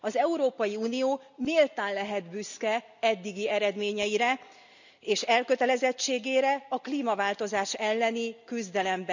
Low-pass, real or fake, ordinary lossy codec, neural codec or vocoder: 7.2 kHz; real; none; none